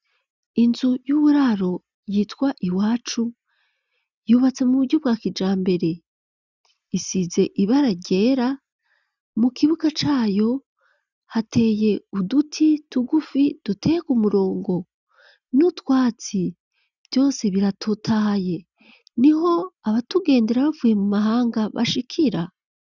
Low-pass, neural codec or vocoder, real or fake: 7.2 kHz; none; real